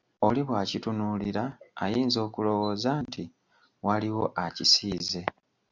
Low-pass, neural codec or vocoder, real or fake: 7.2 kHz; none; real